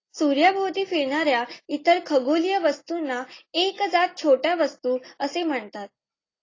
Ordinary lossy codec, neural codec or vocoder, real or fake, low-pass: AAC, 32 kbps; none; real; 7.2 kHz